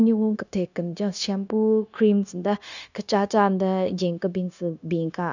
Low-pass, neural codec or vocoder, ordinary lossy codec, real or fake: 7.2 kHz; codec, 16 kHz, 0.9 kbps, LongCat-Audio-Codec; none; fake